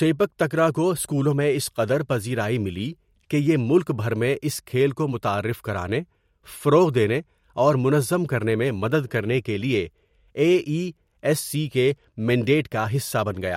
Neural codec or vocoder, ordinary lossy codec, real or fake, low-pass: none; MP3, 64 kbps; real; 19.8 kHz